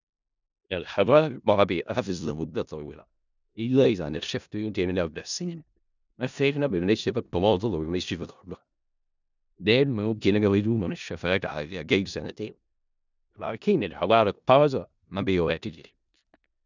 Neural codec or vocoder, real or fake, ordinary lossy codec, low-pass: codec, 16 kHz in and 24 kHz out, 0.4 kbps, LongCat-Audio-Codec, four codebook decoder; fake; none; 7.2 kHz